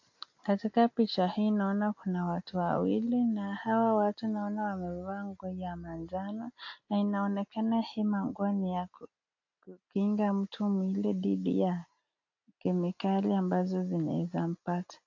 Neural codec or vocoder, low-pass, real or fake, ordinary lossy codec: none; 7.2 kHz; real; AAC, 48 kbps